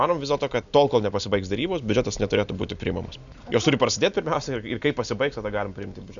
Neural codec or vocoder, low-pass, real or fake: none; 7.2 kHz; real